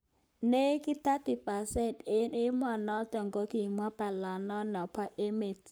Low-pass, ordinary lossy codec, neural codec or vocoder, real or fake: none; none; codec, 44.1 kHz, 7.8 kbps, Pupu-Codec; fake